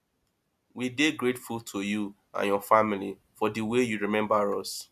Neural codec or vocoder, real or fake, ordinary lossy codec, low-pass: none; real; MP3, 96 kbps; 14.4 kHz